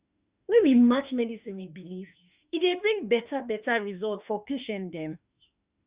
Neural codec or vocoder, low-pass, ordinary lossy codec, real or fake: autoencoder, 48 kHz, 32 numbers a frame, DAC-VAE, trained on Japanese speech; 3.6 kHz; Opus, 64 kbps; fake